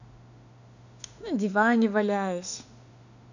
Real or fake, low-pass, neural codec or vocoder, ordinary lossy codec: fake; 7.2 kHz; autoencoder, 48 kHz, 32 numbers a frame, DAC-VAE, trained on Japanese speech; none